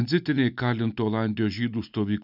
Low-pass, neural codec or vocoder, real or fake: 5.4 kHz; none; real